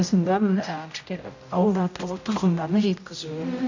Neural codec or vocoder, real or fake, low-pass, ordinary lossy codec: codec, 16 kHz, 0.5 kbps, X-Codec, HuBERT features, trained on general audio; fake; 7.2 kHz; none